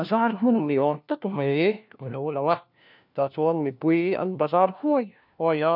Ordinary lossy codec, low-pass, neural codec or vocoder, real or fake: none; 5.4 kHz; codec, 16 kHz, 1 kbps, FunCodec, trained on LibriTTS, 50 frames a second; fake